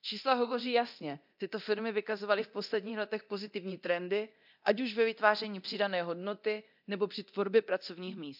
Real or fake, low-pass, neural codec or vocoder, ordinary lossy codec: fake; 5.4 kHz; codec, 24 kHz, 0.9 kbps, DualCodec; MP3, 48 kbps